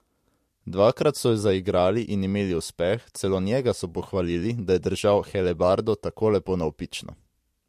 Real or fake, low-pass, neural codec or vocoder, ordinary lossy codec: real; 14.4 kHz; none; MP3, 64 kbps